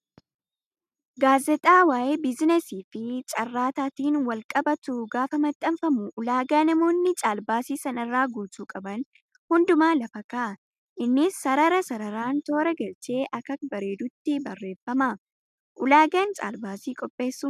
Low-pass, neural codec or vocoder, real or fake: 14.4 kHz; none; real